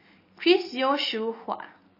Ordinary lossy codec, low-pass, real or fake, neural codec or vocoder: MP3, 24 kbps; 5.4 kHz; real; none